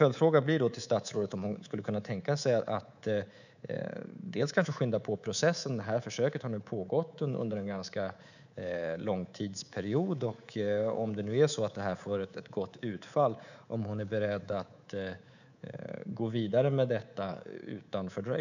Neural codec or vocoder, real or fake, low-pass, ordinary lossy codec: codec, 24 kHz, 3.1 kbps, DualCodec; fake; 7.2 kHz; none